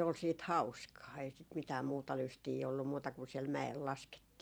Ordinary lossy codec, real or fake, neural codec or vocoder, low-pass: none; fake; vocoder, 44.1 kHz, 128 mel bands every 512 samples, BigVGAN v2; none